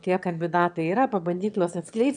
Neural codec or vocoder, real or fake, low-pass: autoencoder, 22.05 kHz, a latent of 192 numbers a frame, VITS, trained on one speaker; fake; 9.9 kHz